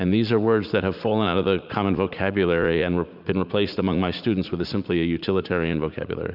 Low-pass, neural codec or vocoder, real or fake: 5.4 kHz; vocoder, 44.1 kHz, 80 mel bands, Vocos; fake